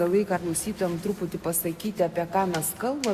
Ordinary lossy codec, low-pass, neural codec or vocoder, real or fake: Opus, 64 kbps; 14.4 kHz; none; real